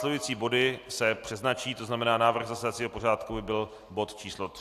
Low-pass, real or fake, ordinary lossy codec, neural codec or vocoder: 14.4 kHz; real; AAC, 64 kbps; none